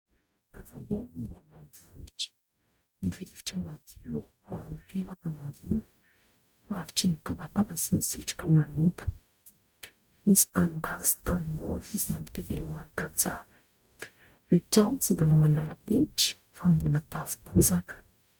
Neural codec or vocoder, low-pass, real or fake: codec, 44.1 kHz, 0.9 kbps, DAC; 19.8 kHz; fake